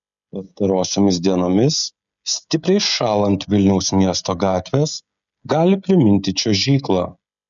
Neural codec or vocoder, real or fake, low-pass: codec, 16 kHz, 16 kbps, FreqCodec, smaller model; fake; 7.2 kHz